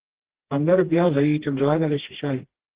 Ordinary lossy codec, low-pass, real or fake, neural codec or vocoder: Opus, 16 kbps; 3.6 kHz; fake; codec, 16 kHz, 1 kbps, FreqCodec, smaller model